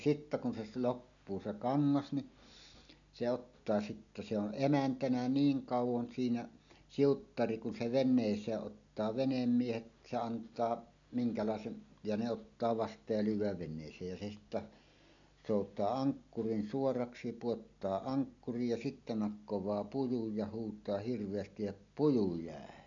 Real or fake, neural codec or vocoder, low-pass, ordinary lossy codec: real; none; 7.2 kHz; none